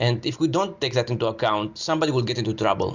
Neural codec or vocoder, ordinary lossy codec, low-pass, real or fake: none; Opus, 64 kbps; 7.2 kHz; real